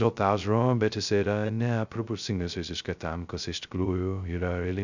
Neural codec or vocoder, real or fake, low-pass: codec, 16 kHz, 0.2 kbps, FocalCodec; fake; 7.2 kHz